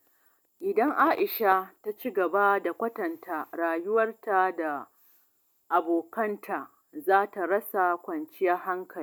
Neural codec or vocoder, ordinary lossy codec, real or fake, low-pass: none; none; real; none